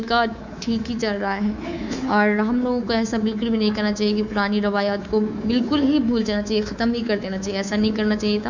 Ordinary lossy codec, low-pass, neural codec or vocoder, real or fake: none; 7.2 kHz; codec, 24 kHz, 3.1 kbps, DualCodec; fake